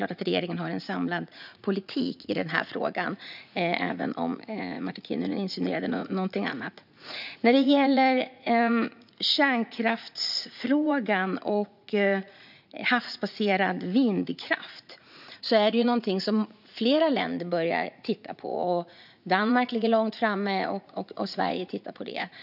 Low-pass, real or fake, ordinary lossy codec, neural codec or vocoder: 5.4 kHz; fake; none; vocoder, 44.1 kHz, 80 mel bands, Vocos